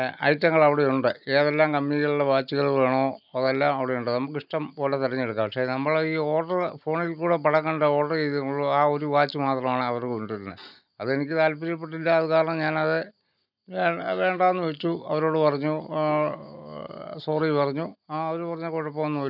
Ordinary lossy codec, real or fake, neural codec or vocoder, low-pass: none; real; none; 5.4 kHz